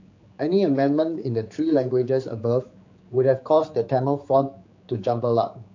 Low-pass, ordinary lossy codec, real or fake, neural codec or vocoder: 7.2 kHz; AAC, 48 kbps; fake; codec, 16 kHz, 4 kbps, X-Codec, HuBERT features, trained on general audio